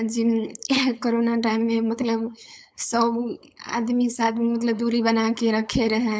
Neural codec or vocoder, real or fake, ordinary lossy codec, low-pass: codec, 16 kHz, 4.8 kbps, FACodec; fake; none; none